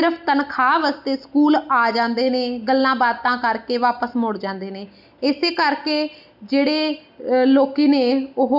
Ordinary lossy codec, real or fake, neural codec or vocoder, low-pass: none; real; none; 5.4 kHz